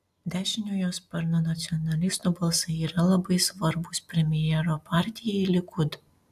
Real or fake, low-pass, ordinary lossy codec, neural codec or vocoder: real; 14.4 kHz; AAC, 96 kbps; none